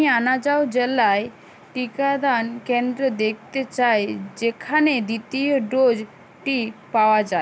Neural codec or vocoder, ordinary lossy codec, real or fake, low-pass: none; none; real; none